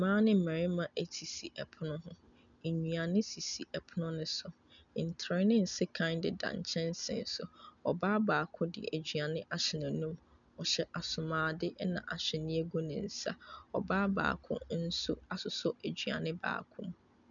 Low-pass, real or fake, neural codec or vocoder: 7.2 kHz; real; none